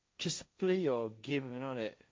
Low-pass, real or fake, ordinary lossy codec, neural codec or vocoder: none; fake; none; codec, 16 kHz, 1.1 kbps, Voila-Tokenizer